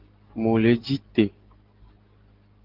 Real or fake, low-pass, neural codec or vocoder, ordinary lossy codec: real; 5.4 kHz; none; Opus, 16 kbps